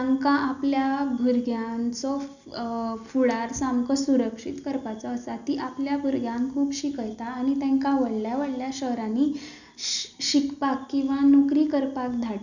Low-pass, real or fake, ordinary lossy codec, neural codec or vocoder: 7.2 kHz; real; none; none